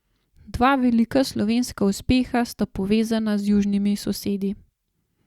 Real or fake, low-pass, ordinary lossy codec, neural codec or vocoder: real; 19.8 kHz; Opus, 64 kbps; none